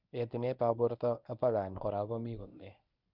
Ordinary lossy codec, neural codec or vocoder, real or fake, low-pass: none; codec, 24 kHz, 0.9 kbps, WavTokenizer, medium speech release version 1; fake; 5.4 kHz